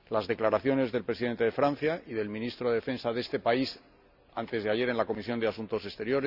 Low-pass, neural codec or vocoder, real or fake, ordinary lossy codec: 5.4 kHz; none; real; none